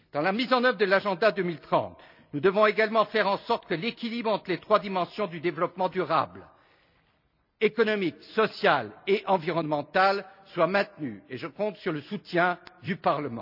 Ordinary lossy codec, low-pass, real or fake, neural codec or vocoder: none; 5.4 kHz; real; none